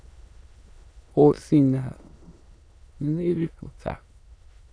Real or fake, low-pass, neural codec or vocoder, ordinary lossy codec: fake; none; autoencoder, 22.05 kHz, a latent of 192 numbers a frame, VITS, trained on many speakers; none